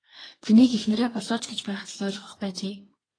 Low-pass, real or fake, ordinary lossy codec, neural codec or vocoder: 9.9 kHz; fake; AAC, 32 kbps; codec, 24 kHz, 1 kbps, SNAC